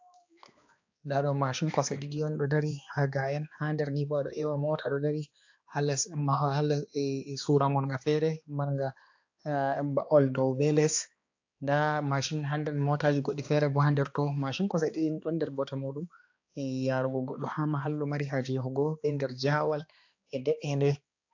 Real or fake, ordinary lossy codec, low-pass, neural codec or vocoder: fake; AAC, 48 kbps; 7.2 kHz; codec, 16 kHz, 2 kbps, X-Codec, HuBERT features, trained on balanced general audio